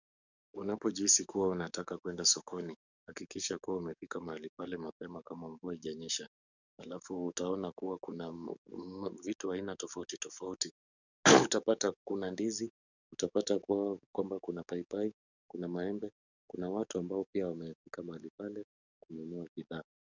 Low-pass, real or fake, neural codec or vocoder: 7.2 kHz; fake; codec, 44.1 kHz, 7.8 kbps, DAC